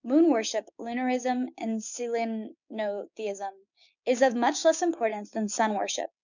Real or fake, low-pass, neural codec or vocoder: fake; 7.2 kHz; codec, 16 kHz, 6 kbps, DAC